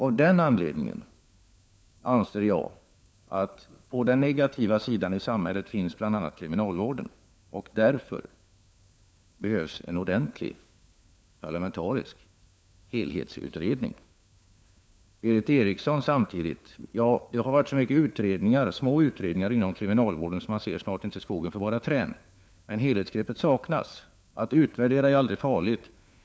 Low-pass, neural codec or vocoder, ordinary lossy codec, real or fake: none; codec, 16 kHz, 4 kbps, FunCodec, trained on LibriTTS, 50 frames a second; none; fake